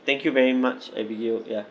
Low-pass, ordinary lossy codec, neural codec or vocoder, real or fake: none; none; none; real